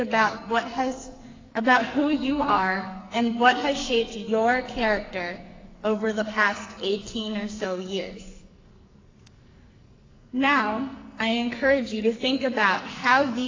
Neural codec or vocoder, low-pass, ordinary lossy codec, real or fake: codec, 44.1 kHz, 2.6 kbps, SNAC; 7.2 kHz; AAC, 32 kbps; fake